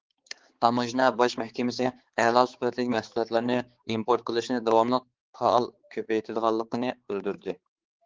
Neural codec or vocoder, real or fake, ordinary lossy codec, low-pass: codec, 16 kHz, 4 kbps, X-Codec, HuBERT features, trained on balanced general audio; fake; Opus, 16 kbps; 7.2 kHz